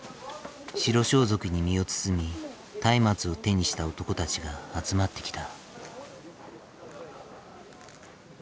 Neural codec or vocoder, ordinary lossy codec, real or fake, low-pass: none; none; real; none